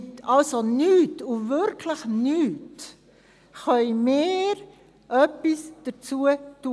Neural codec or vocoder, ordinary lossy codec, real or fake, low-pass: none; none; real; none